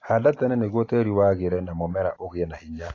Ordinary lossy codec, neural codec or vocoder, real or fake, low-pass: AAC, 32 kbps; none; real; 7.2 kHz